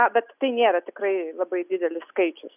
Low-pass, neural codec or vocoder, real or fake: 3.6 kHz; none; real